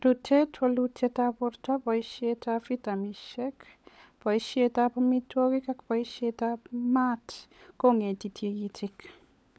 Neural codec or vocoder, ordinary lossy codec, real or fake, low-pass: codec, 16 kHz, 16 kbps, FunCodec, trained on LibriTTS, 50 frames a second; none; fake; none